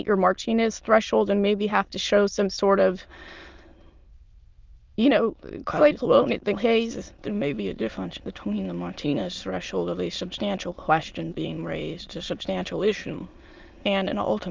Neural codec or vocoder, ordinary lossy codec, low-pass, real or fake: autoencoder, 22.05 kHz, a latent of 192 numbers a frame, VITS, trained on many speakers; Opus, 32 kbps; 7.2 kHz; fake